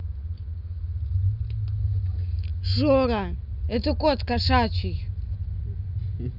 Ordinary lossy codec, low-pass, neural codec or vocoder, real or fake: none; 5.4 kHz; none; real